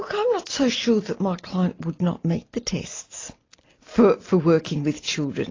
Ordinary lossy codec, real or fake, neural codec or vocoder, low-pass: AAC, 32 kbps; real; none; 7.2 kHz